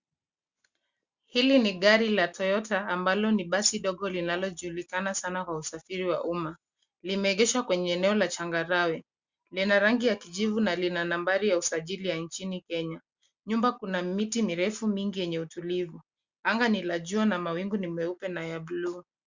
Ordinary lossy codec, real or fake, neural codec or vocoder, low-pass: Opus, 64 kbps; real; none; 7.2 kHz